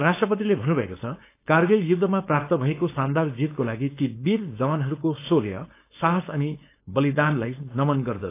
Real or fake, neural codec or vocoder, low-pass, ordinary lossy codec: fake; codec, 16 kHz, 4.8 kbps, FACodec; 3.6 kHz; AAC, 24 kbps